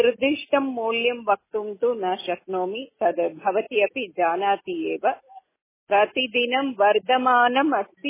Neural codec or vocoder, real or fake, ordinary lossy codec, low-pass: none; real; MP3, 16 kbps; 3.6 kHz